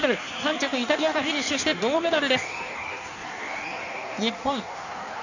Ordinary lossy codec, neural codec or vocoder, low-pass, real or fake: none; codec, 16 kHz in and 24 kHz out, 1.1 kbps, FireRedTTS-2 codec; 7.2 kHz; fake